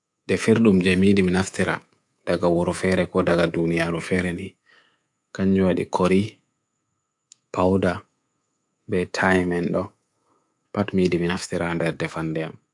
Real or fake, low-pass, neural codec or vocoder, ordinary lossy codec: fake; 10.8 kHz; codec, 24 kHz, 3.1 kbps, DualCodec; AAC, 64 kbps